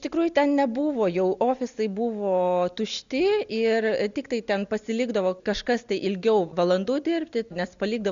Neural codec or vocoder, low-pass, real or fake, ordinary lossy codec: none; 7.2 kHz; real; Opus, 64 kbps